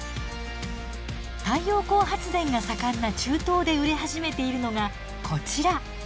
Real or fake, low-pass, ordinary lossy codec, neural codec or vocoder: real; none; none; none